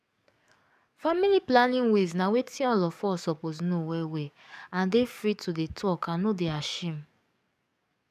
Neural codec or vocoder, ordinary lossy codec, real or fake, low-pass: codec, 44.1 kHz, 7.8 kbps, DAC; none; fake; 14.4 kHz